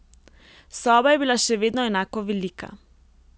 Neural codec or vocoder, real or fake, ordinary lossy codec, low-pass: none; real; none; none